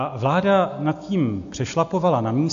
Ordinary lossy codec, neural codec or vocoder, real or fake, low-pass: AAC, 64 kbps; none; real; 7.2 kHz